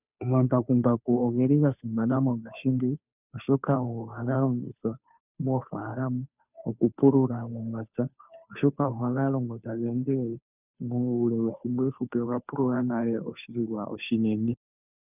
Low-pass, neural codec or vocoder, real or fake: 3.6 kHz; codec, 16 kHz, 2 kbps, FunCodec, trained on Chinese and English, 25 frames a second; fake